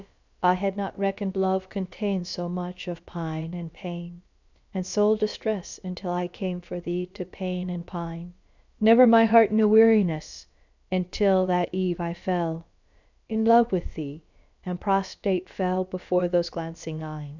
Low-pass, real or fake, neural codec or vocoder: 7.2 kHz; fake; codec, 16 kHz, about 1 kbps, DyCAST, with the encoder's durations